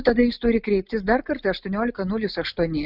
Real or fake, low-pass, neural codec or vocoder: real; 5.4 kHz; none